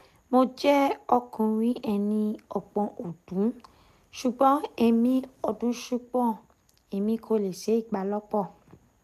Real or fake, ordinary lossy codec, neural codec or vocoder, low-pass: real; none; none; 14.4 kHz